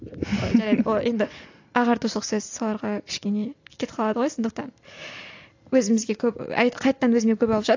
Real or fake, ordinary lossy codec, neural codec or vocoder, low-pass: real; AAC, 48 kbps; none; 7.2 kHz